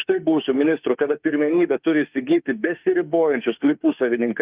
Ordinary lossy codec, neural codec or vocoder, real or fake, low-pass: Opus, 32 kbps; autoencoder, 48 kHz, 32 numbers a frame, DAC-VAE, trained on Japanese speech; fake; 3.6 kHz